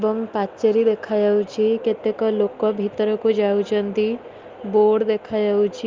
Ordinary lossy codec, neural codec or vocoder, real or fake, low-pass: Opus, 32 kbps; none; real; 7.2 kHz